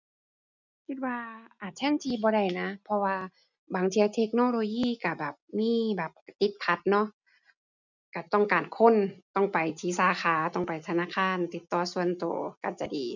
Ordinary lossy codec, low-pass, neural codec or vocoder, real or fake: none; 7.2 kHz; none; real